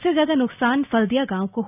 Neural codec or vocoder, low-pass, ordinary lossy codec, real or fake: none; 3.6 kHz; AAC, 32 kbps; real